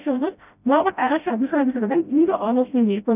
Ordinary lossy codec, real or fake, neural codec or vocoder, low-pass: none; fake; codec, 16 kHz, 0.5 kbps, FreqCodec, smaller model; 3.6 kHz